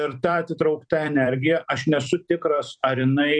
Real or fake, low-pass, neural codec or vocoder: fake; 9.9 kHz; vocoder, 44.1 kHz, 128 mel bands every 256 samples, BigVGAN v2